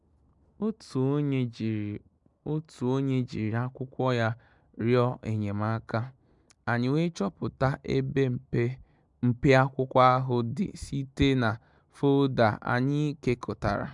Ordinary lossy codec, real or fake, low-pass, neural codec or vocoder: MP3, 96 kbps; real; 10.8 kHz; none